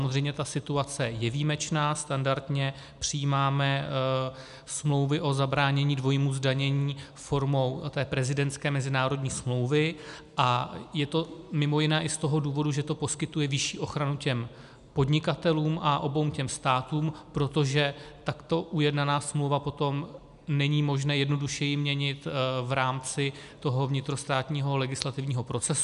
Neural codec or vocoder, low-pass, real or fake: none; 10.8 kHz; real